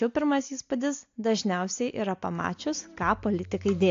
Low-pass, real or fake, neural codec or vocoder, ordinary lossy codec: 7.2 kHz; real; none; AAC, 48 kbps